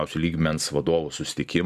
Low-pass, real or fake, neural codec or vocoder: 14.4 kHz; fake; vocoder, 44.1 kHz, 128 mel bands every 512 samples, BigVGAN v2